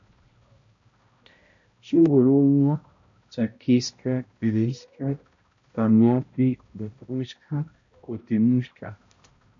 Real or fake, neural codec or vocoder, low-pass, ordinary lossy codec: fake; codec, 16 kHz, 0.5 kbps, X-Codec, HuBERT features, trained on balanced general audio; 7.2 kHz; MP3, 48 kbps